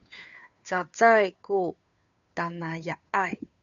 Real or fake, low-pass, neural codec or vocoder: fake; 7.2 kHz; codec, 16 kHz, 2 kbps, FunCodec, trained on Chinese and English, 25 frames a second